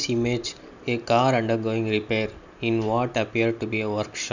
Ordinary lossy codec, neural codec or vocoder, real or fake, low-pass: none; none; real; 7.2 kHz